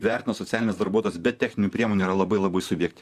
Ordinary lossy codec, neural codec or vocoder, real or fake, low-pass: Opus, 64 kbps; vocoder, 44.1 kHz, 128 mel bands, Pupu-Vocoder; fake; 14.4 kHz